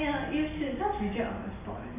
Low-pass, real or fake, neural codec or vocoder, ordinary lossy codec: 3.6 kHz; real; none; MP3, 32 kbps